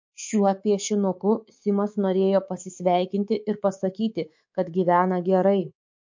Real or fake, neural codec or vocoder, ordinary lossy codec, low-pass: fake; codec, 24 kHz, 3.1 kbps, DualCodec; MP3, 48 kbps; 7.2 kHz